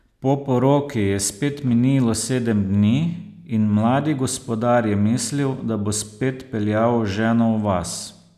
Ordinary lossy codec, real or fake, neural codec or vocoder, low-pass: none; real; none; 14.4 kHz